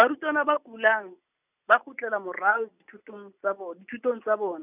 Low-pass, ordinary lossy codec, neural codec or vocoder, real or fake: 3.6 kHz; none; none; real